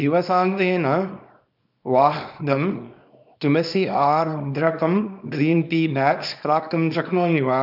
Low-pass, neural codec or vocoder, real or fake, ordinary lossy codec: 5.4 kHz; codec, 24 kHz, 0.9 kbps, WavTokenizer, small release; fake; none